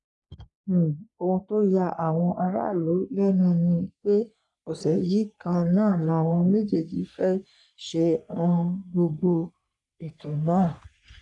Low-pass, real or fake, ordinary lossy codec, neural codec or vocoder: 10.8 kHz; fake; none; codec, 44.1 kHz, 3.4 kbps, Pupu-Codec